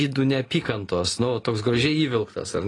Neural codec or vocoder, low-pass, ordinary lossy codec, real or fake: none; 10.8 kHz; AAC, 32 kbps; real